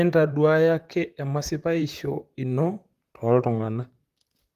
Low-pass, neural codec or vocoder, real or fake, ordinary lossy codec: 14.4 kHz; vocoder, 44.1 kHz, 128 mel bands, Pupu-Vocoder; fake; Opus, 24 kbps